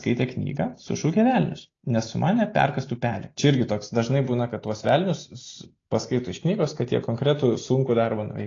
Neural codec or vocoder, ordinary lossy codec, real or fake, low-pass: none; AAC, 32 kbps; real; 7.2 kHz